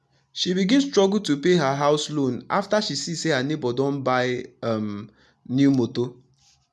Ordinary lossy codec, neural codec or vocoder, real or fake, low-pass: none; none; real; none